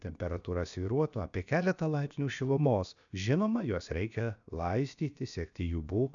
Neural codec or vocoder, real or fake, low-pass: codec, 16 kHz, about 1 kbps, DyCAST, with the encoder's durations; fake; 7.2 kHz